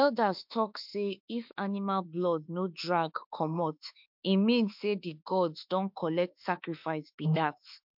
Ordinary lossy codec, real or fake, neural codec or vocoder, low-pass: MP3, 48 kbps; fake; autoencoder, 48 kHz, 32 numbers a frame, DAC-VAE, trained on Japanese speech; 5.4 kHz